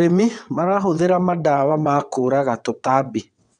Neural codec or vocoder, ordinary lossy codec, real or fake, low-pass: vocoder, 22.05 kHz, 80 mel bands, WaveNeXt; none; fake; 9.9 kHz